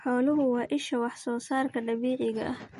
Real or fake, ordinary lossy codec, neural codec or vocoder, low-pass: real; MP3, 48 kbps; none; 14.4 kHz